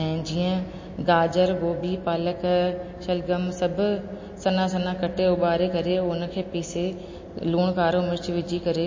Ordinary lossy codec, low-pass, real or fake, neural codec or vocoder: MP3, 32 kbps; 7.2 kHz; real; none